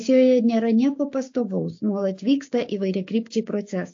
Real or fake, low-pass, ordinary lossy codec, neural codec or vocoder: fake; 7.2 kHz; AAC, 48 kbps; codec, 16 kHz, 6 kbps, DAC